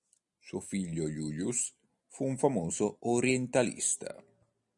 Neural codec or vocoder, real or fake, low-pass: none; real; 10.8 kHz